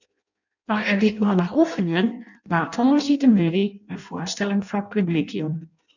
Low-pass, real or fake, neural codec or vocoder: 7.2 kHz; fake; codec, 16 kHz in and 24 kHz out, 0.6 kbps, FireRedTTS-2 codec